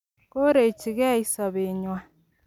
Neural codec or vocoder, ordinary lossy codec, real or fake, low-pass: none; none; real; 19.8 kHz